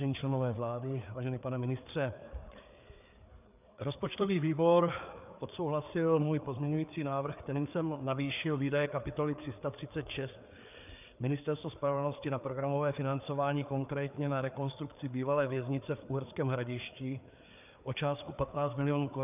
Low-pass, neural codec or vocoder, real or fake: 3.6 kHz; codec, 16 kHz, 4 kbps, FreqCodec, larger model; fake